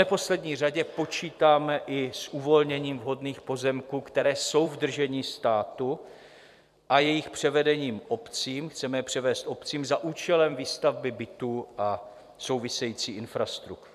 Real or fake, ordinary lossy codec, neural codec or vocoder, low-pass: fake; MP3, 96 kbps; vocoder, 44.1 kHz, 128 mel bands every 256 samples, BigVGAN v2; 14.4 kHz